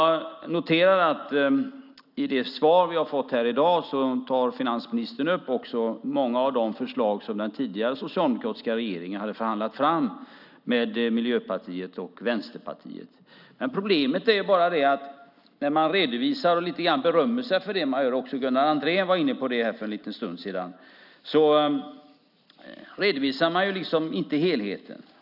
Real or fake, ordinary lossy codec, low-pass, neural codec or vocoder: real; none; 5.4 kHz; none